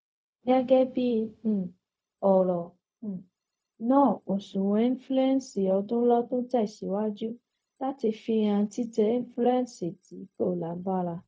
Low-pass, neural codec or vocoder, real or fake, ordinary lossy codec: none; codec, 16 kHz, 0.4 kbps, LongCat-Audio-Codec; fake; none